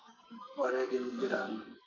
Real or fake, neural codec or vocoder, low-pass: fake; codec, 44.1 kHz, 2.6 kbps, SNAC; 7.2 kHz